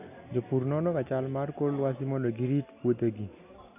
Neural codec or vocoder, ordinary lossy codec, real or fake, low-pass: none; none; real; 3.6 kHz